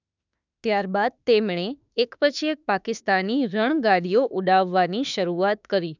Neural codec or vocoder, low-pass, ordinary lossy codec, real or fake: autoencoder, 48 kHz, 32 numbers a frame, DAC-VAE, trained on Japanese speech; 7.2 kHz; none; fake